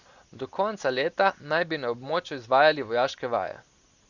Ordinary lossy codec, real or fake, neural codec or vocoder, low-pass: Opus, 64 kbps; real; none; 7.2 kHz